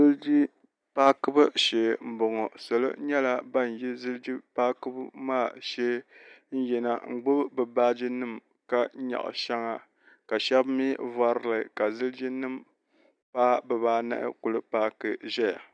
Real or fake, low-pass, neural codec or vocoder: real; 9.9 kHz; none